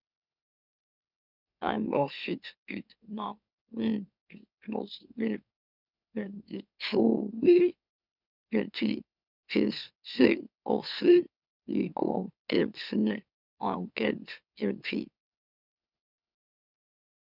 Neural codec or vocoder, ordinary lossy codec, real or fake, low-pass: autoencoder, 44.1 kHz, a latent of 192 numbers a frame, MeloTTS; none; fake; 5.4 kHz